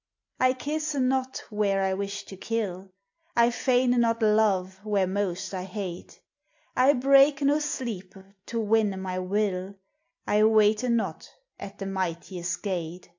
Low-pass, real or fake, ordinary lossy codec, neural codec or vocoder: 7.2 kHz; real; AAC, 48 kbps; none